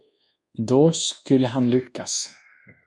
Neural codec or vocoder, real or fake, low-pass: codec, 24 kHz, 1.2 kbps, DualCodec; fake; 10.8 kHz